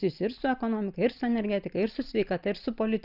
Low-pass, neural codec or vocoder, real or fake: 5.4 kHz; none; real